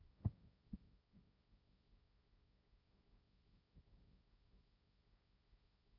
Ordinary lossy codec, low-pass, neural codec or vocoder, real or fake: none; 5.4 kHz; codec, 16 kHz, 1.1 kbps, Voila-Tokenizer; fake